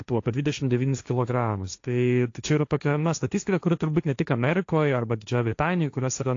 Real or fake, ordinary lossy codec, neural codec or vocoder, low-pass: fake; AAC, 48 kbps; codec, 16 kHz, 1.1 kbps, Voila-Tokenizer; 7.2 kHz